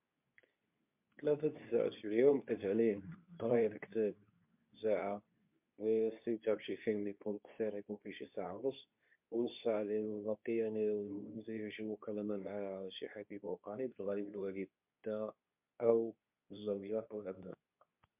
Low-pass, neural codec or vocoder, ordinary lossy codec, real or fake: 3.6 kHz; codec, 24 kHz, 0.9 kbps, WavTokenizer, medium speech release version 2; MP3, 32 kbps; fake